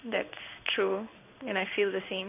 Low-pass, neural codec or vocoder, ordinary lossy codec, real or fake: 3.6 kHz; codec, 16 kHz in and 24 kHz out, 1 kbps, XY-Tokenizer; none; fake